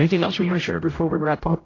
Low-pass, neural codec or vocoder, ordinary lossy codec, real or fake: 7.2 kHz; codec, 16 kHz, 0.5 kbps, X-Codec, HuBERT features, trained on general audio; AAC, 32 kbps; fake